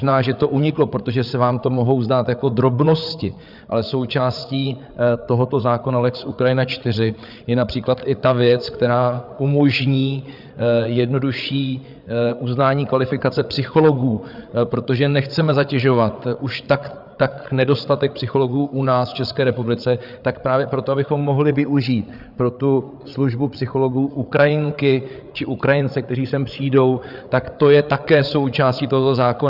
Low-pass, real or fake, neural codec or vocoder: 5.4 kHz; fake; codec, 16 kHz, 8 kbps, FreqCodec, larger model